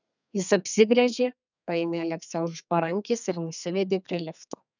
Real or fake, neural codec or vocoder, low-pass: fake; codec, 32 kHz, 1.9 kbps, SNAC; 7.2 kHz